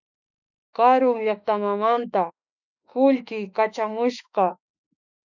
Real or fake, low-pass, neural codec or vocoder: fake; 7.2 kHz; autoencoder, 48 kHz, 32 numbers a frame, DAC-VAE, trained on Japanese speech